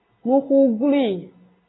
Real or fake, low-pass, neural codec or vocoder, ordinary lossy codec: real; 7.2 kHz; none; AAC, 16 kbps